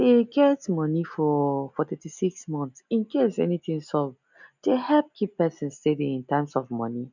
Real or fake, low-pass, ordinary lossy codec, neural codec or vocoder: real; 7.2 kHz; none; none